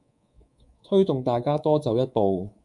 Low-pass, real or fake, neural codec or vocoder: 10.8 kHz; fake; codec, 24 kHz, 3.1 kbps, DualCodec